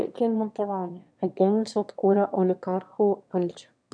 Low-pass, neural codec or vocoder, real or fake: 9.9 kHz; autoencoder, 22.05 kHz, a latent of 192 numbers a frame, VITS, trained on one speaker; fake